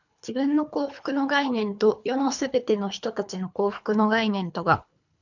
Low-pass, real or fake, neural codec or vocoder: 7.2 kHz; fake; codec, 24 kHz, 3 kbps, HILCodec